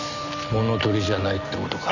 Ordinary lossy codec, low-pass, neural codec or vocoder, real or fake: none; 7.2 kHz; none; real